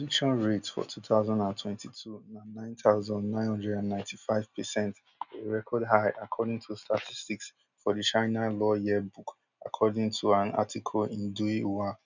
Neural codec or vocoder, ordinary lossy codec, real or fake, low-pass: none; none; real; 7.2 kHz